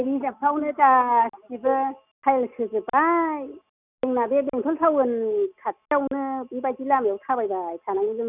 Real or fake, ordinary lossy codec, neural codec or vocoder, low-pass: real; none; none; 3.6 kHz